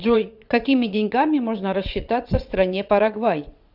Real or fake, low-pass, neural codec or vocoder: fake; 5.4 kHz; vocoder, 22.05 kHz, 80 mel bands, WaveNeXt